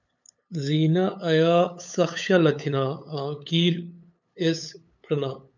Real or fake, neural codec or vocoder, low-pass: fake; codec, 16 kHz, 8 kbps, FunCodec, trained on LibriTTS, 25 frames a second; 7.2 kHz